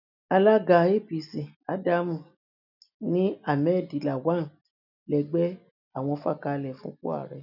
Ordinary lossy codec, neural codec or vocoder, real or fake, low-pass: none; none; real; 5.4 kHz